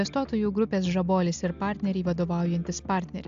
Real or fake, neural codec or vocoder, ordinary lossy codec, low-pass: real; none; AAC, 64 kbps; 7.2 kHz